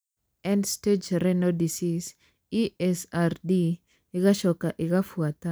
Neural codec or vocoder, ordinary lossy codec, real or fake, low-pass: none; none; real; none